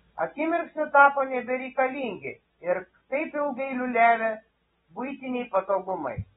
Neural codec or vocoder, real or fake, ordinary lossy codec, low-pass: none; real; AAC, 16 kbps; 7.2 kHz